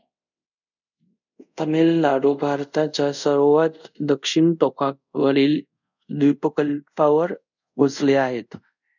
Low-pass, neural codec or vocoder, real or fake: 7.2 kHz; codec, 24 kHz, 0.5 kbps, DualCodec; fake